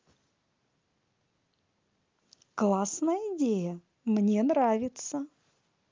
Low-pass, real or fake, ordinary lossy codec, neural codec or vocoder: 7.2 kHz; real; Opus, 32 kbps; none